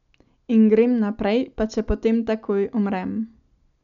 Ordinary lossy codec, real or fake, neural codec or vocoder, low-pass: none; real; none; 7.2 kHz